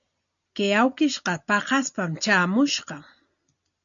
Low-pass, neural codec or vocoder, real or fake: 7.2 kHz; none; real